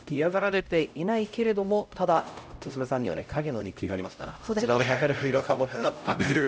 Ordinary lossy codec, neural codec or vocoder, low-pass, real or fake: none; codec, 16 kHz, 0.5 kbps, X-Codec, HuBERT features, trained on LibriSpeech; none; fake